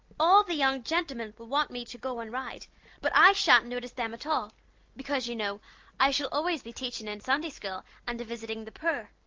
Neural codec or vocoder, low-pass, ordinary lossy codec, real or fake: none; 7.2 kHz; Opus, 32 kbps; real